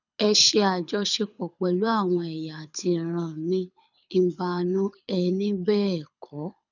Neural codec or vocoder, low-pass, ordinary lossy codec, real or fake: codec, 24 kHz, 6 kbps, HILCodec; 7.2 kHz; none; fake